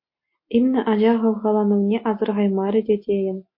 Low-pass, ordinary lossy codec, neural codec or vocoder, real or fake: 5.4 kHz; MP3, 32 kbps; none; real